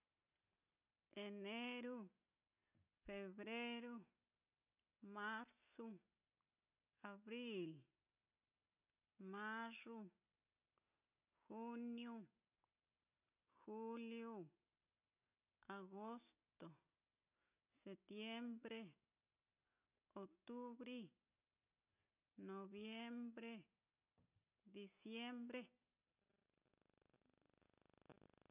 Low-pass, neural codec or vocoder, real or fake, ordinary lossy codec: 3.6 kHz; none; real; none